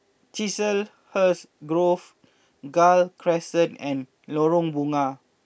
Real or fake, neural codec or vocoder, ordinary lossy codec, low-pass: real; none; none; none